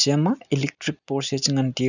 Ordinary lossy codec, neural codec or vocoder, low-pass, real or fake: none; none; 7.2 kHz; real